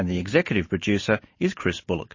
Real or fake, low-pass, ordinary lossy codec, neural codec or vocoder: real; 7.2 kHz; MP3, 32 kbps; none